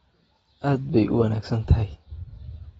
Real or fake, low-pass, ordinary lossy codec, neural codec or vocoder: real; 19.8 kHz; AAC, 24 kbps; none